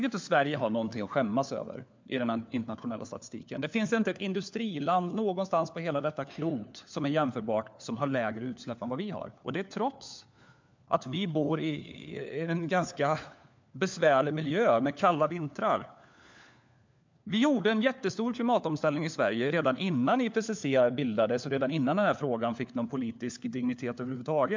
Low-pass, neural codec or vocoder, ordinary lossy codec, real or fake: 7.2 kHz; codec, 16 kHz, 4 kbps, FunCodec, trained on LibriTTS, 50 frames a second; MP3, 64 kbps; fake